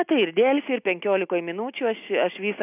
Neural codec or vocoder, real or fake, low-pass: none; real; 3.6 kHz